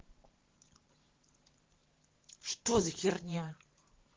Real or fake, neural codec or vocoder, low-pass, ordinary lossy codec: fake; vocoder, 44.1 kHz, 128 mel bands every 512 samples, BigVGAN v2; 7.2 kHz; Opus, 16 kbps